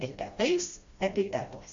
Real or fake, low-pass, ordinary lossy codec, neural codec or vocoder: fake; 7.2 kHz; MP3, 48 kbps; codec, 16 kHz, 1 kbps, FreqCodec, smaller model